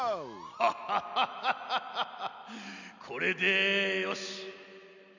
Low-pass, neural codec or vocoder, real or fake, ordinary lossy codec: 7.2 kHz; none; real; none